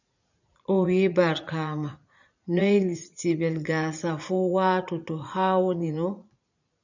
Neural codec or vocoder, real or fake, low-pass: vocoder, 44.1 kHz, 80 mel bands, Vocos; fake; 7.2 kHz